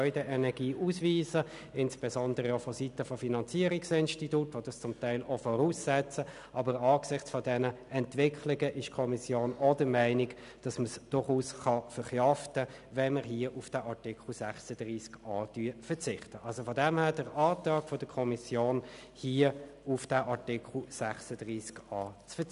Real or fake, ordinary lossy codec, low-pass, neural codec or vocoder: real; none; 10.8 kHz; none